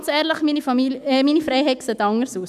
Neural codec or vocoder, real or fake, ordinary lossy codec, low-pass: autoencoder, 48 kHz, 128 numbers a frame, DAC-VAE, trained on Japanese speech; fake; none; 14.4 kHz